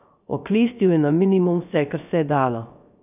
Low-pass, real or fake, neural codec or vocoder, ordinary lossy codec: 3.6 kHz; fake; codec, 16 kHz, 0.3 kbps, FocalCodec; none